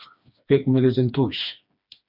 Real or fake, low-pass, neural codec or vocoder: fake; 5.4 kHz; codec, 16 kHz, 2 kbps, FreqCodec, smaller model